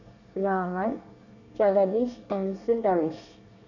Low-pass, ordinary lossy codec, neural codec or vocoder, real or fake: 7.2 kHz; none; codec, 24 kHz, 1 kbps, SNAC; fake